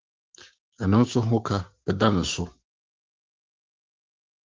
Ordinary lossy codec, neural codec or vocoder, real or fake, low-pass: Opus, 32 kbps; codec, 16 kHz, 6 kbps, DAC; fake; 7.2 kHz